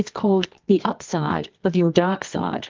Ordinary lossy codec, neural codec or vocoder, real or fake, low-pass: Opus, 24 kbps; codec, 24 kHz, 0.9 kbps, WavTokenizer, medium music audio release; fake; 7.2 kHz